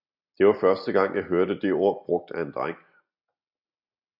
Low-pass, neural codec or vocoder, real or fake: 5.4 kHz; none; real